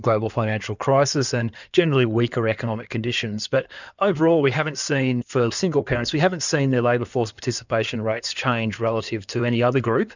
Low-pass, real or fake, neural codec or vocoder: 7.2 kHz; fake; codec, 16 kHz in and 24 kHz out, 2.2 kbps, FireRedTTS-2 codec